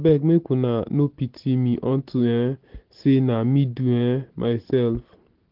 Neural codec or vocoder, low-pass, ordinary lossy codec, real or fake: none; 5.4 kHz; Opus, 16 kbps; real